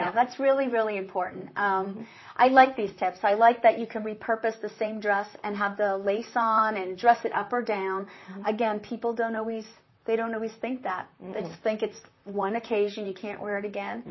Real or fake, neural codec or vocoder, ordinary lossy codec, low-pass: fake; vocoder, 44.1 kHz, 128 mel bands, Pupu-Vocoder; MP3, 24 kbps; 7.2 kHz